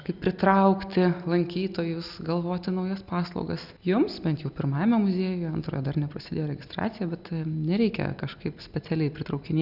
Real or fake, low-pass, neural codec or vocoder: real; 5.4 kHz; none